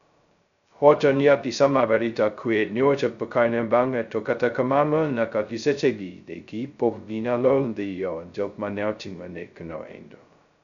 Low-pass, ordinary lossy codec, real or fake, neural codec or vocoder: 7.2 kHz; none; fake; codec, 16 kHz, 0.2 kbps, FocalCodec